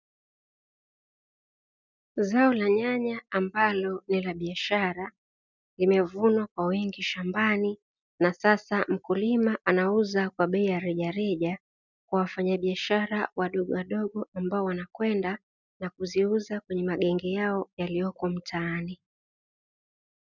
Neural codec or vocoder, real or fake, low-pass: none; real; 7.2 kHz